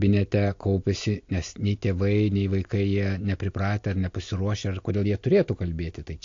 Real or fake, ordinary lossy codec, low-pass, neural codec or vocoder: real; AAC, 48 kbps; 7.2 kHz; none